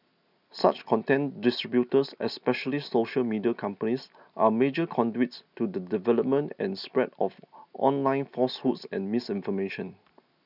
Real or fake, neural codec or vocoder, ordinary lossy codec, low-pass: real; none; none; 5.4 kHz